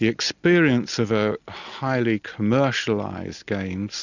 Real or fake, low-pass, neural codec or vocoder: real; 7.2 kHz; none